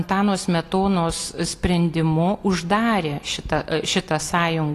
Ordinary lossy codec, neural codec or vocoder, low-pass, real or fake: AAC, 48 kbps; none; 14.4 kHz; real